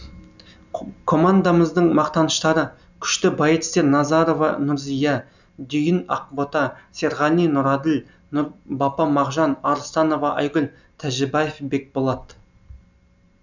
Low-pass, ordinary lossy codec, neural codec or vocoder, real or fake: 7.2 kHz; none; none; real